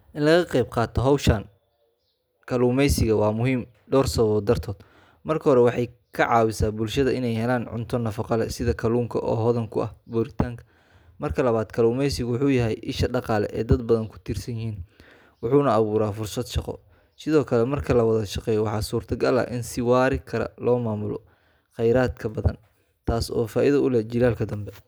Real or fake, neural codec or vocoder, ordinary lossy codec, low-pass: real; none; none; none